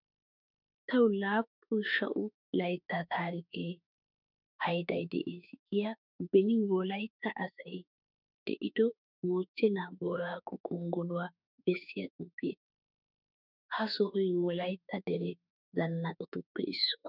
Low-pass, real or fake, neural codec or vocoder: 5.4 kHz; fake; autoencoder, 48 kHz, 32 numbers a frame, DAC-VAE, trained on Japanese speech